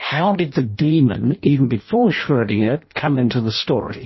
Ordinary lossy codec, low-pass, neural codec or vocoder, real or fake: MP3, 24 kbps; 7.2 kHz; codec, 16 kHz in and 24 kHz out, 0.6 kbps, FireRedTTS-2 codec; fake